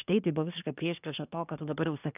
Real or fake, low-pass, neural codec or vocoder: fake; 3.6 kHz; codec, 24 kHz, 1 kbps, SNAC